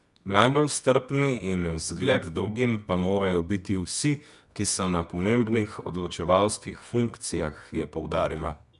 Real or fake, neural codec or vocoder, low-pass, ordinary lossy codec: fake; codec, 24 kHz, 0.9 kbps, WavTokenizer, medium music audio release; 10.8 kHz; none